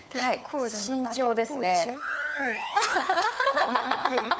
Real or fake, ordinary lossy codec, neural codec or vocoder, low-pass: fake; none; codec, 16 kHz, 4 kbps, FunCodec, trained on LibriTTS, 50 frames a second; none